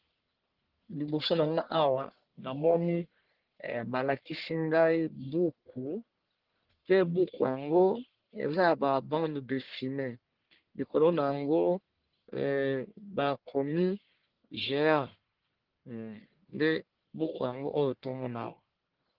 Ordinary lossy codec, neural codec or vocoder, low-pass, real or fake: Opus, 16 kbps; codec, 44.1 kHz, 1.7 kbps, Pupu-Codec; 5.4 kHz; fake